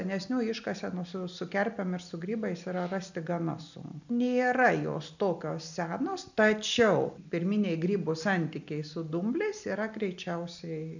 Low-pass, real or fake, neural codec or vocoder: 7.2 kHz; real; none